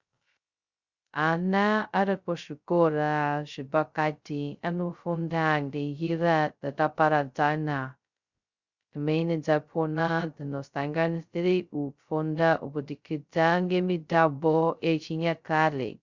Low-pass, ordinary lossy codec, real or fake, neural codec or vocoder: 7.2 kHz; Opus, 64 kbps; fake; codec, 16 kHz, 0.2 kbps, FocalCodec